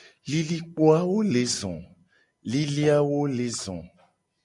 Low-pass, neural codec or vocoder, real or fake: 10.8 kHz; none; real